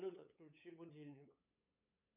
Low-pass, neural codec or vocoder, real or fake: 3.6 kHz; codec, 16 kHz, 8 kbps, FunCodec, trained on LibriTTS, 25 frames a second; fake